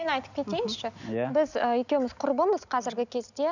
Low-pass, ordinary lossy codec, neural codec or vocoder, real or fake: 7.2 kHz; none; none; real